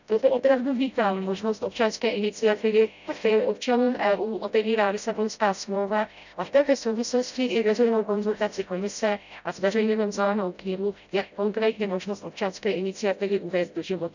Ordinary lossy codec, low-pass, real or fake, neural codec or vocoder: none; 7.2 kHz; fake; codec, 16 kHz, 0.5 kbps, FreqCodec, smaller model